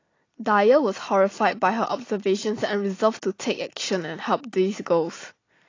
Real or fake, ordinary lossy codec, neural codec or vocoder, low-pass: real; AAC, 32 kbps; none; 7.2 kHz